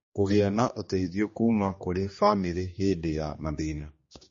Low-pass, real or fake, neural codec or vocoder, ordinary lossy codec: 7.2 kHz; fake; codec, 16 kHz, 2 kbps, X-Codec, HuBERT features, trained on general audio; MP3, 32 kbps